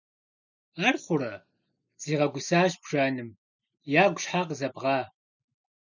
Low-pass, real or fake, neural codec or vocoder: 7.2 kHz; real; none